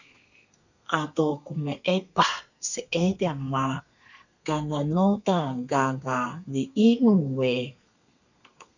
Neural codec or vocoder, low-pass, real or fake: codec, 32 kHz, 1.9 kbps, SNAC; 7.2 kHz; fake